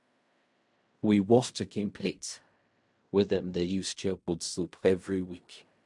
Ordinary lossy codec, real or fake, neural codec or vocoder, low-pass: MP3, 64 kbps; fake; codec, 16 kHz in and 24 kHz out, 0.4 kbps, LongCat-Audio-Codec, fine tuned four codebook decoder; 10.8 kHz